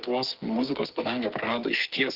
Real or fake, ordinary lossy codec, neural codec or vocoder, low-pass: fake; Opus, 16 kbps; codec, 44.1 kHz, 2.6 kbps, SNAC; 5.4 kHz